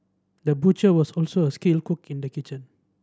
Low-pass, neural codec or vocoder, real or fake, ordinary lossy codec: none; none; real; none